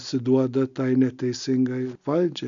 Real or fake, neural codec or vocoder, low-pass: real; none; 7.2 kHz